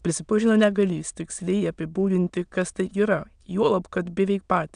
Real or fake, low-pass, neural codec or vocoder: fake; 9.9 kHz; autoencoder, 22.05 kHz, a latent of 192 numbers a frame, VITS, trained on many speakers